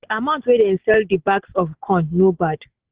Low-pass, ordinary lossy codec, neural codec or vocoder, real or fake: 3.6 kHz; Opus, 16 kbps; none; real